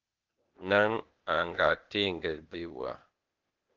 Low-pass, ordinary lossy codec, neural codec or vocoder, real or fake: 7.2 kHz; Opus, 32 kbps; codec, 16 kHz, 0.8 kbps, ZipCodec; fake